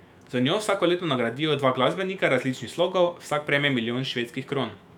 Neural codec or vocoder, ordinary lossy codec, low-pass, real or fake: autoencoder, 48 kHz, 128 numbers a frame, DAC-VAE, trained on Japanese speech; none; 19.8 kHz; fake